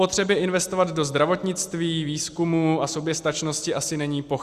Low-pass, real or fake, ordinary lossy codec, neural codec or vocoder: 14.4 kHz; real; Opus, 64 kbps; none